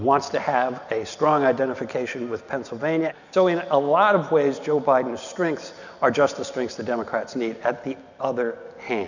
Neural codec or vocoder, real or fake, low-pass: none; real; 7.2 kHz